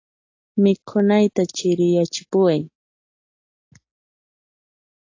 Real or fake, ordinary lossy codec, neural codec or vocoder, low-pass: real; AAC, 48 kbps; none; 7.2 kHz